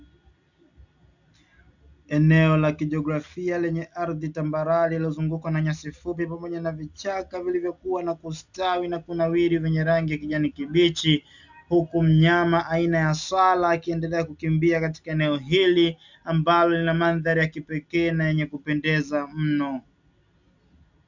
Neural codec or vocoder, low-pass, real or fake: none; 7.2 kHz; real